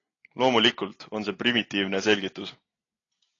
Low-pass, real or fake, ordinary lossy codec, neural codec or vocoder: 7.2 kHz; real; AAC, 32 kbps; none